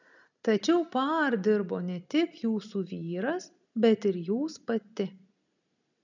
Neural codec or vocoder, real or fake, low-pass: none; real; 7.2 kHz